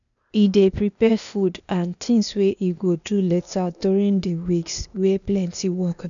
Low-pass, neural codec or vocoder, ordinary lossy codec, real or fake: 7.2 kHz; codec, 16 kHz, 0.8 kbps, ZipCodec; none; fake